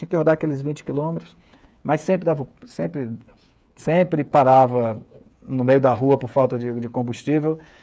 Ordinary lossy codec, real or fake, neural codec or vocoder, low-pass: none; fake; codec, 16 kHz, 8 kbps, FreqCodec, smaller model; none